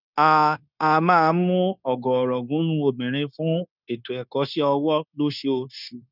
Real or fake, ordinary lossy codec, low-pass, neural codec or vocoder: fake; none; 5.4 kHz; codec, 16 kHz, 0.9 kbps, LongCat-Audio-Codec